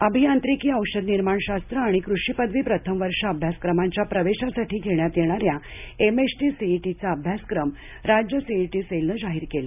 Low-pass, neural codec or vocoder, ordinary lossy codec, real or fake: 3.6 kHz; none; none; real